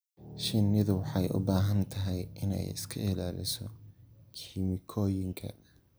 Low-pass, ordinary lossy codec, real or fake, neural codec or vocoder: none; none; real; none